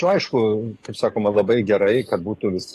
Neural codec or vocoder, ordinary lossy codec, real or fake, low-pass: codec, 44.1 kHz, 7.8 kbps, DAC; AAC, 48 kbps; fake; 14.4 kHz